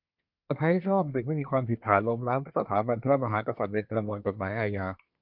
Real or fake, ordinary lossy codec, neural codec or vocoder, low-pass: fake; MP3, 48 kbps; codec, 24 kHz, 1 kbps, SNAC; 5.4 kHz